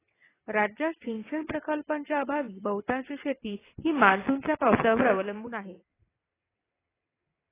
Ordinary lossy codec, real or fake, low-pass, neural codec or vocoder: AAC, 16 kbps; fake; 3.6 kHz; vocoder, 22.05 kHz, 80 mel bands, WaveNeXt